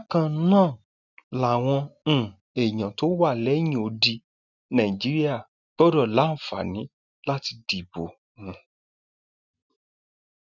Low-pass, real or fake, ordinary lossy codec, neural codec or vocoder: 7.2 kHz; real; none; none